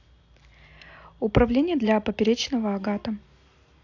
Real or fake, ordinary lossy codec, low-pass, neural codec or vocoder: real; AAC, 48 kbps; 7.2 kHz; none